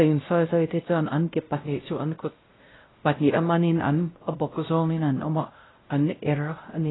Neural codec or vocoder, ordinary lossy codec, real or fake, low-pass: codec, 16 kHz, 0.5 kbps, X-Codec, WavLM features, trained on Multilingual LibriSpeech; AAC, 16 kbps; fake; 7.2 kHz